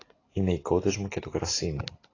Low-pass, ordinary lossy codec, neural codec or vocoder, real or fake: 7.2 kHz; AAC, 32 kbps; none; real